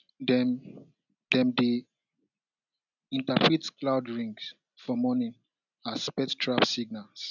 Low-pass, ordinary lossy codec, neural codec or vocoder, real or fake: 7.2 kHz; none; none; real